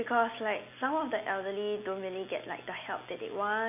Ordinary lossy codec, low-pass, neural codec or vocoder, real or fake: none; 3.6 kHz; none; real